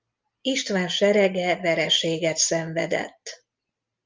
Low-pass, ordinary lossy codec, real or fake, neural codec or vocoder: 7.2 kHz; Opus, 32 kbps; real; none